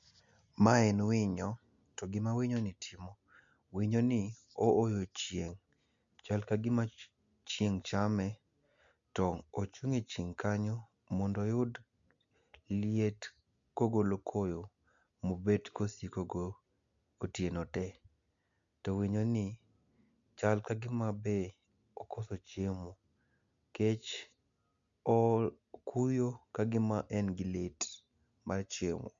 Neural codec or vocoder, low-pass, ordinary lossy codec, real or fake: none; 7.2 kHz; MP3, 64 kbps; real